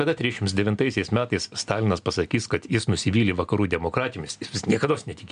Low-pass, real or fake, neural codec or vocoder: 9.9 kHz; real; none